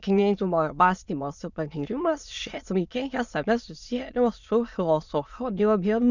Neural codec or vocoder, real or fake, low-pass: autoencoder, 22.05 kHz, a latent of 192 numbers a frame, VITS, trained on many speakers; fake; 7.2 kHz